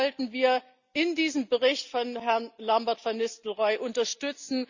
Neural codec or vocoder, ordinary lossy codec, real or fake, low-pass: none; Opus, 64 kbps; real; 7.2 kHz